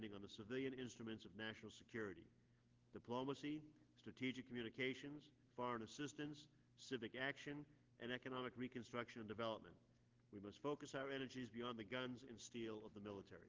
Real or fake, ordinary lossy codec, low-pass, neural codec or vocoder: real; Opus, 16 kbps; 7.2 kHz; none